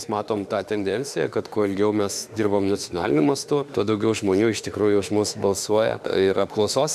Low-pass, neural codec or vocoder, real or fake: 14.4 kHz; autoencoder, 48 kHz, 32 numbers a frame, DAC-VAE, trained on Japanese speech; fake